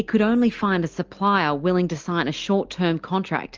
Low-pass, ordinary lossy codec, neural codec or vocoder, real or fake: 7.2 kHz; Opus, 24 kbps; none; real